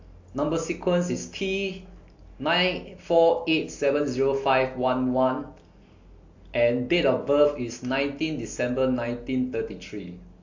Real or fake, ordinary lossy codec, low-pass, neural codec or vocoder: real; AAC, 48 kbps; 7.2 kHz; none